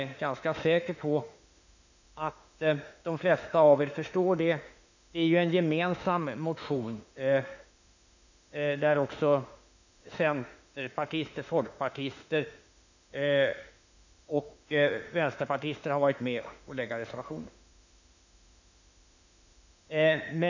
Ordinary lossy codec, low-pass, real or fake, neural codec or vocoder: none; 7.2 kHz; fake; autoencoder, 48 kHz, 32 numbers a frame, DAC-VAE, trained on Japanese speech